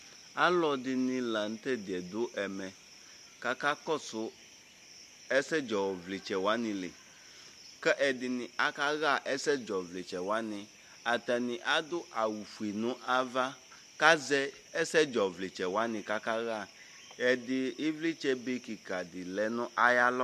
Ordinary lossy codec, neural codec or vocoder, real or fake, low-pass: MP3, 64 kbps; none; real; 14.4 kHz